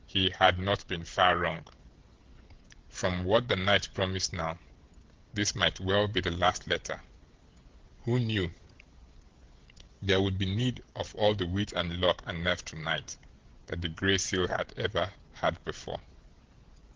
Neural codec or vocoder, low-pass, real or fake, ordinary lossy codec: codec, 16 kHz, 16 kbps, FreqCodec, smaller model; 7.2 kHz; fake; Opus, 16 kbps